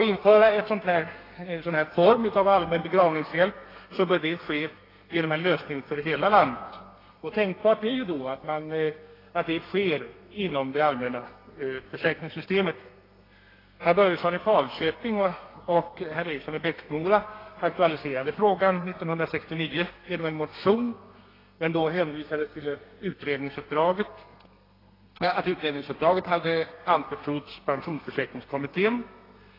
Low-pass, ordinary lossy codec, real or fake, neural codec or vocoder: 5.4 kHz; AAC, 24 kbps; fake; codec, 32 kHz, 1.9 kbps, SNAC